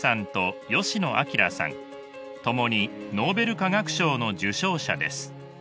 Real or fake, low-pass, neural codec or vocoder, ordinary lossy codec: real; none; none; none